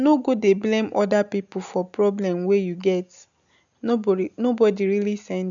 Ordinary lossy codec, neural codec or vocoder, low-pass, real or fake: none; none; 7.2 kHz; real